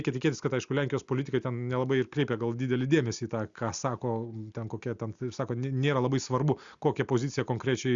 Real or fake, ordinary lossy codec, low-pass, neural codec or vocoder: real; Opus, 64 kbps; 7.2 kHz; none